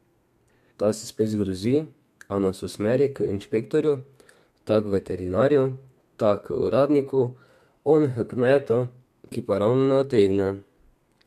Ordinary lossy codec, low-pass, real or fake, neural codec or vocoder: MP3, 96 kbps; 14.4 kHz; fake; codec, 32 kHz, 1.9 kbps, SNAC